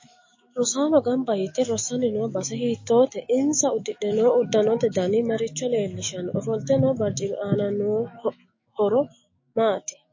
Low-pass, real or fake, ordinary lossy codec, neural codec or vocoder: 7.2 kHz; fake; MP3, 32 kbps; autoencoder, 48 kHz, 128 numbers a frame, DAC-VAE, trained on Japanese speech